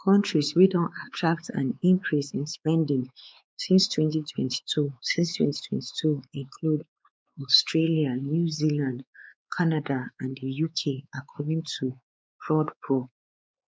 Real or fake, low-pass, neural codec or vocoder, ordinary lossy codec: fake; none; codec, 16 kHz, 4 kbps, X-Codec, WavLM features, trained on Multilingual LibriSpeech; none